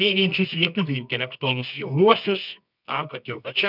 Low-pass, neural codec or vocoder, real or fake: 5.4 kHz; codec, 24 kHz, 0.9 kbps, WavTokenizer, medium music audio release; fake